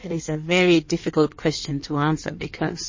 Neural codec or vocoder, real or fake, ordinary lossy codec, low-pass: codec, 16 kHz in and 24 kHz out, 1.1 kbps, FireRedTTS-2 codec; fake; MP3, 32 kbps; 7.2 kHz